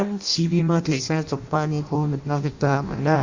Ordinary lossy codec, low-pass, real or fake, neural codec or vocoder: Opus, 64 kbps; 7.2 kHz; fake; codec, 16 kHz in and 24 kHz out, 0.6 kbps, FireRedTTS-2 codec